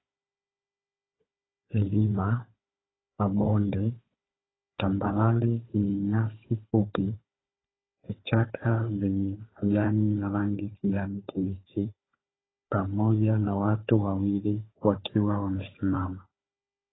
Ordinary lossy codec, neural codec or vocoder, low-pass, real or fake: AAC, 16 kbps; codec, 16 kHz, 4 kbps, FunCodec, trained on Chinese and English, 50 frames a second; 7.2 kHz; fake